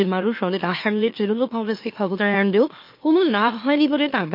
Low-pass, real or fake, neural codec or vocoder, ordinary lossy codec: 5.4 kHz; fake; autoencoder, 44.1 kHz, a latent of 192 numbers a frame, MeloTTS; MP3, 32 kbps